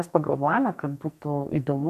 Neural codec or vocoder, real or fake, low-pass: codec, 44.1 kHz, 2.6 kbps, SNAC; fake; 14.4 kHz